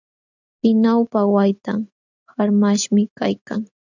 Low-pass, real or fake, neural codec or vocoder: 7.2 kHz; real; none